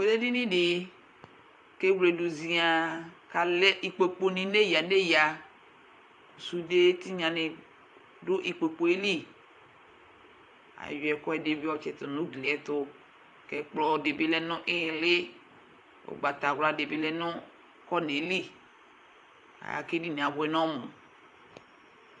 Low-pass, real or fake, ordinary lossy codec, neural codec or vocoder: 10.8 kHz; fake; AAC, 64 kbps; vocoder, 44.1 kHz, 128 mel bands, Pupu-Vocoder